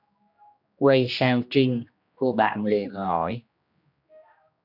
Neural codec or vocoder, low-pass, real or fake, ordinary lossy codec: codec, 16 kHz, 1 kbps, X-Codec, HuBERT features, trained on general audio; 5.4 kHz; fake; AAC, 48 kbps